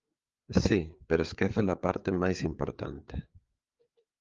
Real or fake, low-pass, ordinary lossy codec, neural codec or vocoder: fake; 7.2 kHz; Opus, 32 kbps; codec, 16 kHz, 4 kbps, FreqCodec, larger model